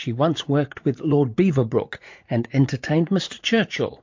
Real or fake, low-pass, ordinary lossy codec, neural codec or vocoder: fake; 7.2 kHz; MP3, 48 kbps; vocoder, 44.1 kHz, 80 mel bands, Vocos